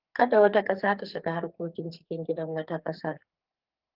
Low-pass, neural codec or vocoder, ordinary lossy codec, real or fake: 5.4 kHz; codec, 44.1 kHz, 2.6 kbps, SNAC; Opus, 32 kbps; fake